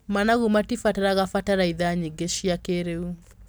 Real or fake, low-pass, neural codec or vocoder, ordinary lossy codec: real; none; none; none